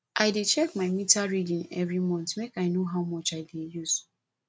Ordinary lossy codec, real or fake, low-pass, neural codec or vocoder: none; real; none; none